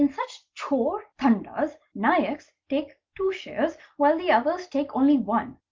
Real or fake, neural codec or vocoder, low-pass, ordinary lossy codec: real; none; 7.2 kHz; Opus, 32 kbps